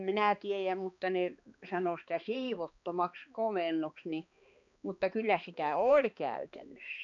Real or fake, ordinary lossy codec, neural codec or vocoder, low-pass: fake; none; codec, 16 kHz, 2 kbps, X-Codec, HuBERT features, trained on balanced general audio; 7.2 kHz